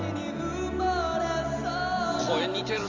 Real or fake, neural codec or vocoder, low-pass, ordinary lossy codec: real; none; 7.2 kHz; Opus, 32 kbps